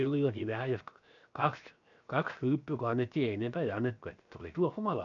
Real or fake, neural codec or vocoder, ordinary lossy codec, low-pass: fake; codec, 16 kHz, 0.7 kbps, FocalCodec; none; 7.2 kHz